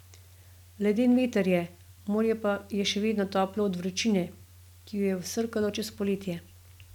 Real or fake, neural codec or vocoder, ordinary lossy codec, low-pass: real; none; none; 19.8 kHz